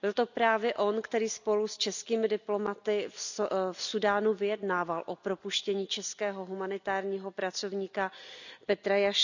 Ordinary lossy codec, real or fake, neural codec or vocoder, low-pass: none; real; none; 7.2 kHz